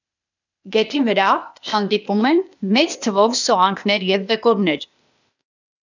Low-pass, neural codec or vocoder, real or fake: 7.2 kHz; codec, 16 kHz, 0.8 kbps, ZipCodec; fake